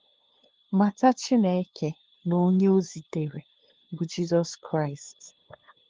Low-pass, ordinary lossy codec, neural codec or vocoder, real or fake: 7.2 kHz; Opus, 16 kbps; codec, 16 kHz, 2 kbps, FunCodec, trained on LibriTTS, 25 frames a second; fake